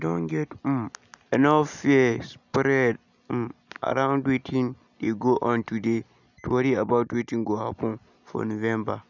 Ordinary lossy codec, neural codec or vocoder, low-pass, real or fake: none; none; 7.2 kHz; real